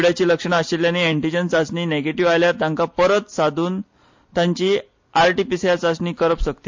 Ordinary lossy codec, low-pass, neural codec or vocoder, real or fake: MP3, 48 kbps; 7.2 kHz; none; real